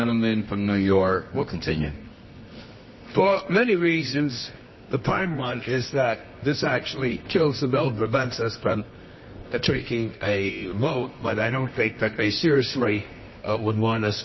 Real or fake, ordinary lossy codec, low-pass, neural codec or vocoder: fake; MP3, 24 kbps; 7.2 kHz; codec, 24 kHz, 0.9 kbps, WavTokenizer, medium music audio release